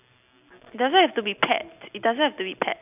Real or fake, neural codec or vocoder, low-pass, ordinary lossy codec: real; none; 3.6 kHz; none